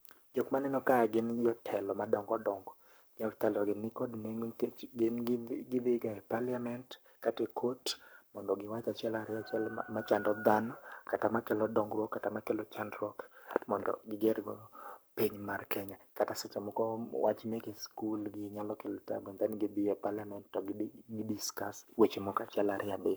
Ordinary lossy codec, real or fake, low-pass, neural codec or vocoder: none; fake; none; codec, 44.1 kHz, 7.8 kbps, Pupu-Codec